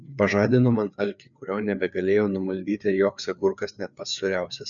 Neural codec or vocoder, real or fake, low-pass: codec, 16 kHz, 4 kbps, FreqCodec, larger model; fake; 7.2 kHz